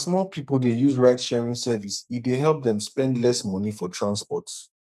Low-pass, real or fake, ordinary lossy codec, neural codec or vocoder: 14.4 kHz; fake; none; codec, 44.1 kHz, 2.6 kbps, SNAC